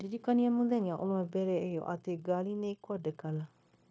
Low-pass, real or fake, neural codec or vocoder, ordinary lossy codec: none; fake; codec, 16 kHz, 0.9 kbps, LongCat-Audio-Codec; none